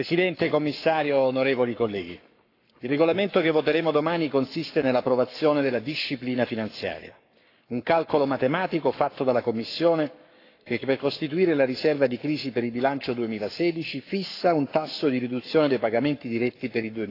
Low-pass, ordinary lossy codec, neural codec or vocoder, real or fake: 5.4 kHz; AAC, 32 kbps; codec, 44.1 kHz, 7.8 kbps, Pupu-Codec; fake